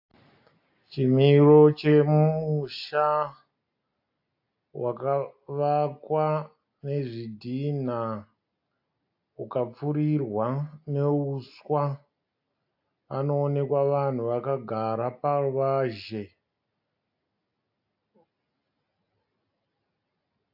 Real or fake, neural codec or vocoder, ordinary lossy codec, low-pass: real; none; AAC, 48 kbps; 5.4 kHz